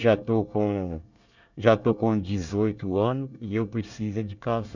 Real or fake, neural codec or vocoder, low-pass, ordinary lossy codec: fake; codec, 24 kHz, 1 kbps, SNAC; 7.2 kHz; none